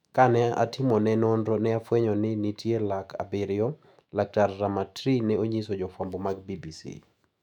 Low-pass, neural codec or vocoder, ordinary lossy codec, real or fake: 19.8 kHz; autoencoder, 48 kHz, 128 numbers a frame, DAC-VAE, trained on Japanese speech; none; fake